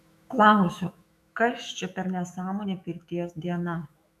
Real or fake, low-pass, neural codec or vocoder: fake; 14.4 kHz; autoencoder, 48 kHz, 128 numbers a frame, DAC-VAE, trained on Japanese speech